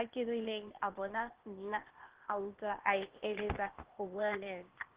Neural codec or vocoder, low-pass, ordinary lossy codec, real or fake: codec, 16 kHz, 0.8 kbps, ZipCodec; 3.6 kHz; Opus, 16 kbps; fake